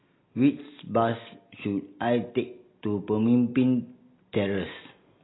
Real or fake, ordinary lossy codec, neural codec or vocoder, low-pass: real; AAC, 16 kbps; none; 7.2 kHz